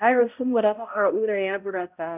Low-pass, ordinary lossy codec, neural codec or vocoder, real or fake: 3.6 kHz; none; codec, 16 kHz, 0.5 kbps, X-Codec, HuBERT features, trained on balanced general audio; fake